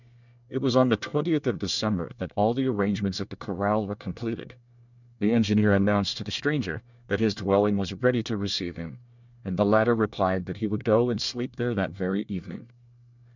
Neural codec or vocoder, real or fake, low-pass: codec, 24 kHz, 1 kbps, SNAC; fake; 7.2 kHz